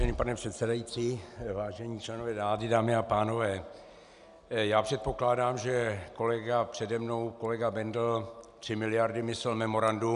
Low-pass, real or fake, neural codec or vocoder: 10.8 kHz; real; none